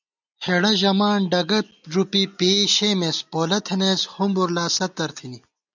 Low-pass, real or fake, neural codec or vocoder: 7.2 kHz; real; none